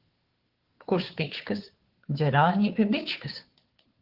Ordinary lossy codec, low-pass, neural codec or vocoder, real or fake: Opus, 16 kbps; 5.4 kHz; codec, 16 kHz, 2 kbps, FunCodec, trained on Chinese and English, 25 frames a second; fake